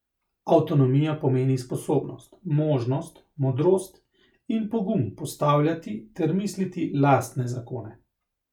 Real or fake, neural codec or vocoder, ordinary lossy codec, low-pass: real; none; none; 19.8 kHz